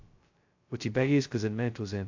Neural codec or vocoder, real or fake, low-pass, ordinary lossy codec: codec, 16 kHz, 0.2 kbps, FocalCodec; fake; 7.2 kHz; AAC, 48 kbps